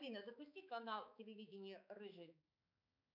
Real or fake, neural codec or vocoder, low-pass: fake; codec, 16 kHz, 4 kbps, X-Codec, HuBERT features, trained on balanced general audio; 5.4 kHz